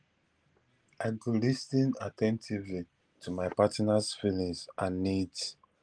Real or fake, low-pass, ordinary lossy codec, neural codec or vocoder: real; 9.9 kHz; Opus, 24 kbps; none